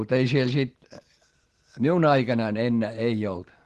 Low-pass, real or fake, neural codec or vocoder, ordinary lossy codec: 10.8 kHz; real; none; Opus, 16 kbps